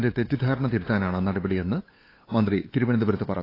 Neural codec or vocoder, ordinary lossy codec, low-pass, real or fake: codec, 16 kHz, 8 kbps, FunCodec, trained on LibriTTS, 25 frames a second; AAC, 24 kbps; 5.4 kHz; fake